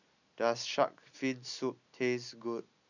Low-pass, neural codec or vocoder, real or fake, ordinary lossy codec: 7.2 kHz; none; real; none